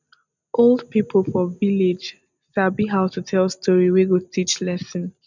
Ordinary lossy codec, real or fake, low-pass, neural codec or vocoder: none; real; 7.2 kHz; none